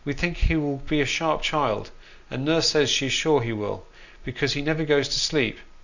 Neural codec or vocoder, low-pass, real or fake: none; 7.2 kHz; real